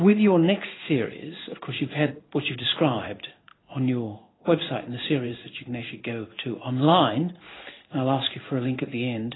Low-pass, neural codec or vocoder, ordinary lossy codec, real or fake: 7.2 kHz; codec, 16 kHz in and 24 kHz out, 1 kbps, XY-Tokenizer; AAC, 16 kbps; fake